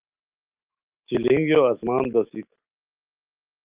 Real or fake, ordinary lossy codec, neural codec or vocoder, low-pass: fake; Opus, 32 kbps; autoencoder, 48 kHz, 128 numbers a frame, DAC-VAE, trained on Japanese speech; 3.6 kHz